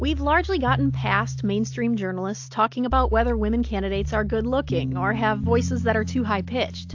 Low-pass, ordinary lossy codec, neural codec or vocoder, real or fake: 7.2 kHz; AAC, 48 kbps; none; real